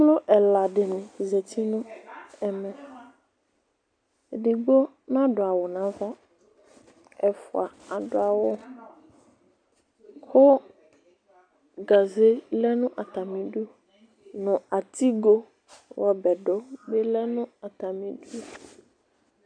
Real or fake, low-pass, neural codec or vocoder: real; 9.9 kHz; none